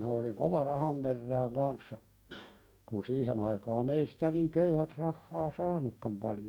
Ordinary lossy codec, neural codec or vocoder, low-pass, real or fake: none; codec, 44.1 kHz, 2.6 kbps, DAC; 19.8 kHz; fake